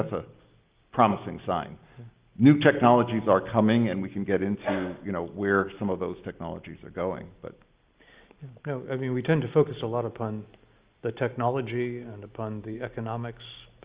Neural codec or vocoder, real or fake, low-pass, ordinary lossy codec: none; real; 3.6 kHz; Opus, 32 kbps